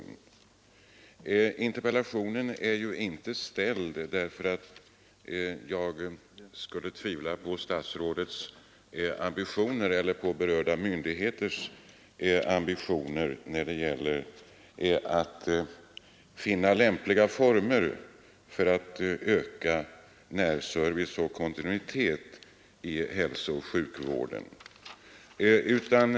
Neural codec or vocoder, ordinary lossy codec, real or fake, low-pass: none; none; real; none